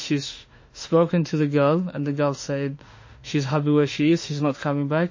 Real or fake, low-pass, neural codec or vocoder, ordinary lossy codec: fake; 7.2 kHz; autoencoder, 48 kHz, 32 numbers a frame, DAC-VAE, trained on Japanese speech; MP3, 32 kbps